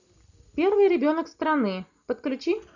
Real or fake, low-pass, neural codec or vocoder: real; 7.2 kHz; none